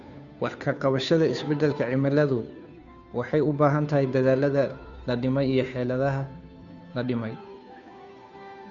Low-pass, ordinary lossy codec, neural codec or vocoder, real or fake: 7.2 kHz; none; codec, 16 kHz, 2 kbps, FunCodec, trained on Chinese and English, 25 frames a second; fake